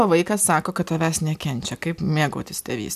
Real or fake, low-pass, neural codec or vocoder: real; 14.4 kHz; none